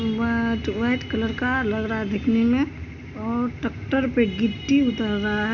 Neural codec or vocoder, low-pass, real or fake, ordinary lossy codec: none; 7.2 kHz; real; none